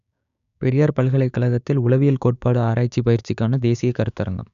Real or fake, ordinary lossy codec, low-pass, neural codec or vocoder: fake; none; 7.2 kHz; codec, 16 kHz, 6 kbps, DAC